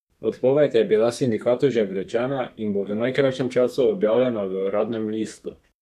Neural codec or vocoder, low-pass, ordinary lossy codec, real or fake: codec, 32 kHz, 1.9 kbps, SNAC; 14.4 kHz; none; fake